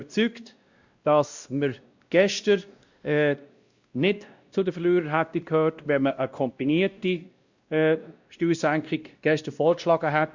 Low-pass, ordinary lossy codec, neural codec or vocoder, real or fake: 7.2 kHz; Opus, 64 kbps; codec, 16 kHz, 1 kbps, X-Codec, WavLM features, trained on Multilingual LibriSpeech; fake